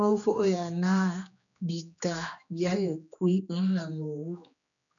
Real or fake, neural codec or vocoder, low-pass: fake; codec, 16 kHz, 2 kbps, X-Codec, HuBERT features, trained on general audio; 7.2 kHz